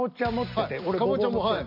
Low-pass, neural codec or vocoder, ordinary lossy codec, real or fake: 5.4 kHz; none; none; real